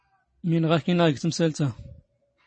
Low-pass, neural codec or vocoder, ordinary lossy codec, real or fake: 9.9 kHz; none; MP3, 32 kbps; real